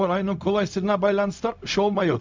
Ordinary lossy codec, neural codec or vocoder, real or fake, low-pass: MP3, 64 kbps; codec, 16 kHz, 0.4 kbps, LongCat-Audio-Codec; fake; 7.2 kHz